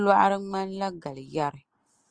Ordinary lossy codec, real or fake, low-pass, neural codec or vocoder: Opus, 24 kbps; real; 9.9 kHz; none